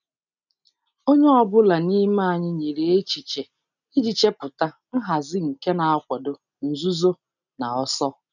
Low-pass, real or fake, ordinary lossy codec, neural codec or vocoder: 7.2 kHz; real; none; none